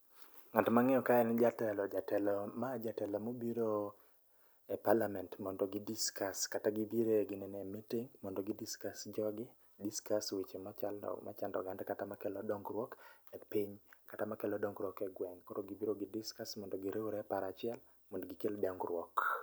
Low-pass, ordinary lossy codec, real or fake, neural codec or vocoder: none; none; real; none